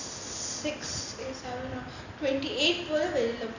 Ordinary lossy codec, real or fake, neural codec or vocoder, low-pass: none; real; none; 7.2 kHz